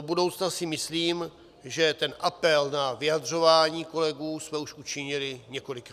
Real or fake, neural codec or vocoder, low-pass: real; none; 14.4 kHz